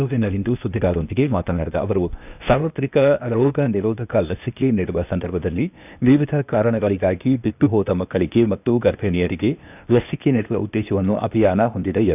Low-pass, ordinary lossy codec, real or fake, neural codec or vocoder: 3.6 kHz; none; fake; codec, 16 kHz, 0.8 kbps, ZipCodec